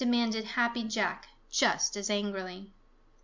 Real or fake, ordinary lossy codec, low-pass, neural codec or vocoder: real; MP3, 64 kbps; 7.2 kHz; none